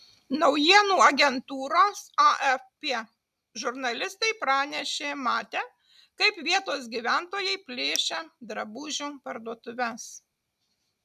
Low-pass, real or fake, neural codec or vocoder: 14.4 kHz; real; none